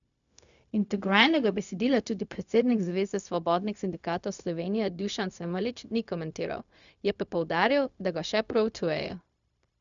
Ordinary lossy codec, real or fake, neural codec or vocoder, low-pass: none; fake; codec, 16 kHz, 0.4 kbps, LongCat-Audio-Codec; 7.2 kHz